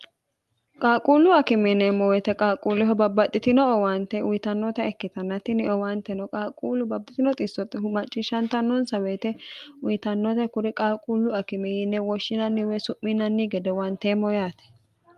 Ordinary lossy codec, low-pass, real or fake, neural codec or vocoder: Opus, 32 kbps; 14.4 kHz; real; none